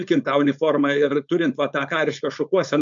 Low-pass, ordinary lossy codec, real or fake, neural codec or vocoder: 7.2 kHz; MP3, 64 kbps; fake; codec, 16 kHz, 4.8 kbps, FACodec